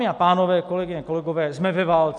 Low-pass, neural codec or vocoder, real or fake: 10.8 kHz; none; real